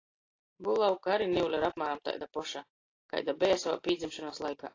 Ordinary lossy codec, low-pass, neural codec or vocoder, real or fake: AAC, 32 kbps; 7.2 kHz; none; real